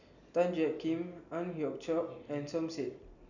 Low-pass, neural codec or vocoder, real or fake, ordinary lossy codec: 7.2 kHz; none; real; none